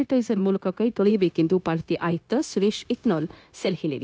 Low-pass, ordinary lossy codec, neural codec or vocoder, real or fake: none; none; codec, 16 kHz, 0.9 kbps, LongCat-Audio-Codec; fake